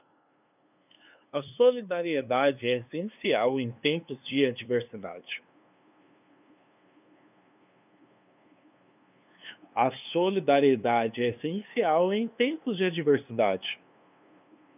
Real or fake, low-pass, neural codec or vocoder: fake; 3.6 kHz; codec, 16 kHz, 2 kbps, FunCodec, trained on LibriTTS, 25 frames a second